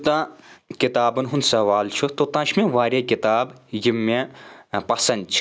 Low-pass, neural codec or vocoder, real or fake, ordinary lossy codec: none; none; real; none